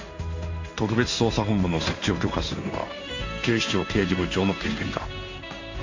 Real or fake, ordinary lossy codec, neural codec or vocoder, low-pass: fake; AAC, 48 kbps; codec, 16 kHz in and 24 kHz out, 1 kbps, XY-Tokenizer; 7.2 kHz